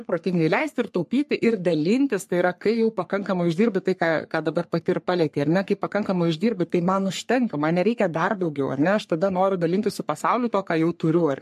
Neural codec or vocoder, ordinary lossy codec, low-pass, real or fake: codec, 44.1 kHz, 3.4 kbps, Pupu-Codec; MP3, 64 kbps; 14.4 kHz; fake